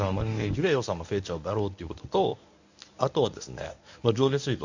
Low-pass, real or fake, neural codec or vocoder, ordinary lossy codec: 7.2 kHz; fake; codec, 24 kHz, 0.9 kbps, WavTokenizer, medium speech release version 1; none